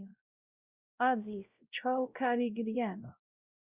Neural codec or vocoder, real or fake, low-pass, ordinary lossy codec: codec, 16 kHz, 0.5 kbps, X-Codec, WavLM features, trained on Multilingual LibriSpeech; fake; 3.6 kHz; Opus, 64 kbps